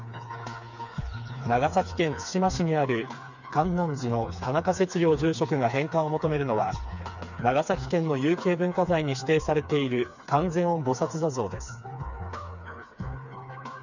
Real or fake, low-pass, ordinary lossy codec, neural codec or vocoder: fake; 7.2 kHz; none; codec, 16 kHz, 4 kbps, FreqCodec, smaller model